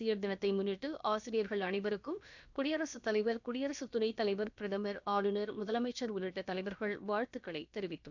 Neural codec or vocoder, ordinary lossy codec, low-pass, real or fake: codec, 16 kHz, about 1 kbps, DyCAST, with the encoder's durations; none; 7.2 kHz; fake